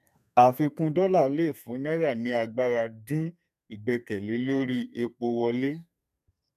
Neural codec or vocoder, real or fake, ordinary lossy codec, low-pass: codec, 32 kHz, 1.9 kbps, SNAC; fake; none; 14.4 kHz